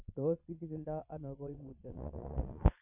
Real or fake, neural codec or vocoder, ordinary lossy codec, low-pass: fake; vocoder, 22.05 kHz, 80 mel bands, Vocos; none; 3.6 kHz